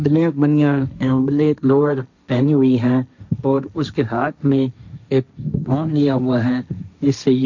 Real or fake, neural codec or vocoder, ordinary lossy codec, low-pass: fake; codec, 16 kHz, 1.1 kbps, Voila-Tokenizer; none; 7.2 kHz